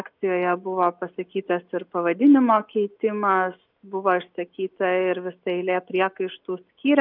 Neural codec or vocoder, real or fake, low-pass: none; real; 5.4 kHz